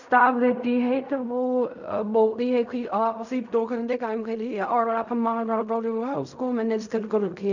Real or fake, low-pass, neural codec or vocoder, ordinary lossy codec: fake; 7.2 kHz; codec, 16 kHz in and 24 kHz out, 0.4 kbps, LongCat-Audio-Codec, fine tuned four codebook decoder; none